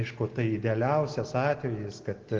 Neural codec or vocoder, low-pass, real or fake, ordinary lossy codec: none; 7.2 kHz; real; Opus, 16 kbps